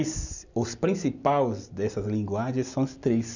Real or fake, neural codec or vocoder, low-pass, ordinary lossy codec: real; none; 7.2 kHz; none